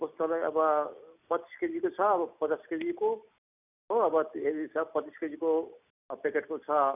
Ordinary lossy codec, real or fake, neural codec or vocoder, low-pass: none; real; none; 3.6 kHz